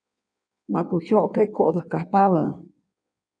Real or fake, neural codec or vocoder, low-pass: fake; codec, 16 kHz in and 24 kHz out, 1.1 kbps, FireRedTTS-2 codec; 9.9 kHz